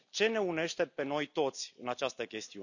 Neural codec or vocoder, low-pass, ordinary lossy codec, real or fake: none; 7.2 kHz; none; real